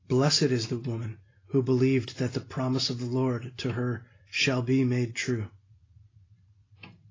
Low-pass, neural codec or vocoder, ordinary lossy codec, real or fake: 7.2 kHz; none; AAC, 32 kbps; real